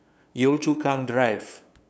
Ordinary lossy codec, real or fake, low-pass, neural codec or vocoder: none; fake; none; codec, 16 kHz, 2 kbps, FunCodec, trained on LibriTTS, 25 frames a second